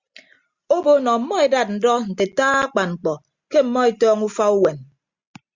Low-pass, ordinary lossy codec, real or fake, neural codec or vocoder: 7.2 kHz; Opus, 64 kbps; real; none